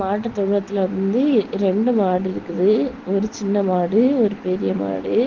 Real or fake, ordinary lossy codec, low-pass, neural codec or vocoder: real; Opus, 16 kbps; 7.2 kHz; none